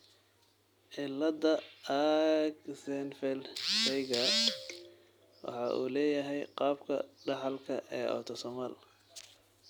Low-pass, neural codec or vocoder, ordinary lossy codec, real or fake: none; none; none; real